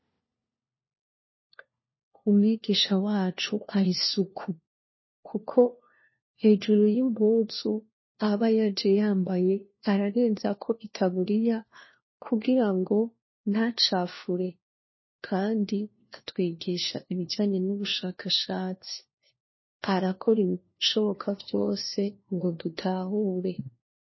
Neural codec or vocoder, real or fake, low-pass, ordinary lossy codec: codec, 16 kHz, 1 kbps, FunCodec, trained on LibriTTS, 50 frames a second; fake; 7.2 kHz; MP3, 24 kbps